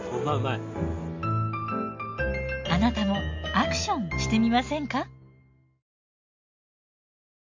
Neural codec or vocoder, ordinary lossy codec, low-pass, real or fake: none; MP3, 48 kbps; 7.2 kHz; real